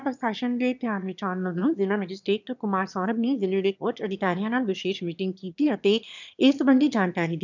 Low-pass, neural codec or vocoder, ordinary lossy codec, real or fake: 7.2 kHz; autoencoder, 22.05 kHz, a latent of 192 numbers a frame, VITS, trained on one speaker; none; fake